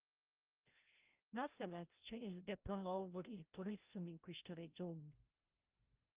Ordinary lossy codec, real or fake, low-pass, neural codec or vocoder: Opus, 24 kbps; fake; 3.6 kHz; codec, 16 kHz, 0.5 kbps, FreqCodec, larger model